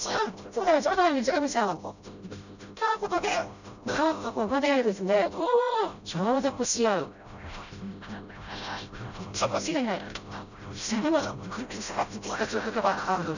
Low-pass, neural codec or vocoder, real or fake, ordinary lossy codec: 7.2 kHz; codec, 16 kHz, 0.5 kbps, FreqCodec, smaller model; fake; none